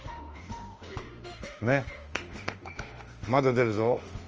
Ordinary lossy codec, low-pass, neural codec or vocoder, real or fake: Opus, 24 kbps; 7.2 kHz; autoencoder, 48 kHz, 32 numbers a frame, DAC-VAE, trained on Japanese speech; fake